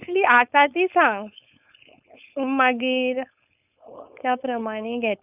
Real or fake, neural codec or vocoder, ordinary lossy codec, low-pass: fake; codec, 16 kHz, 4.8 kbps, FACodec; none; 3.6 kHz